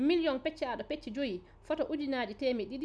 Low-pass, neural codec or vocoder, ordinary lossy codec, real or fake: none; none; none; real